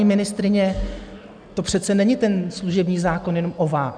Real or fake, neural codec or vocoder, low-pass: real; none; 9.9 kHz